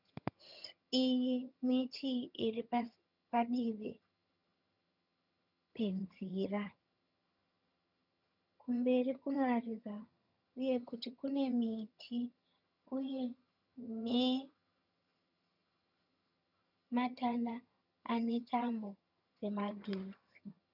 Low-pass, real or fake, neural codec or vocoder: 5.4 kHz; fake; vocoder, 22.05 kHz, 80 mel bands, HiFi-GAN